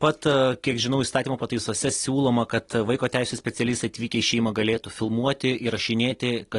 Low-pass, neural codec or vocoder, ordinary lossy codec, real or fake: 10.8 kHz; none; AAC, 32 kbps; real